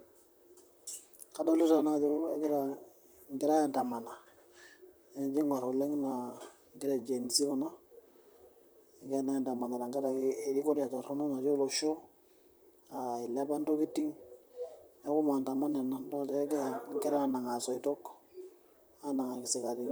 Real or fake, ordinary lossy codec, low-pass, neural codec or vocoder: fake; none; none; vocoder, 44.1 kHz, 128 mel bands, Pupu-Vocoder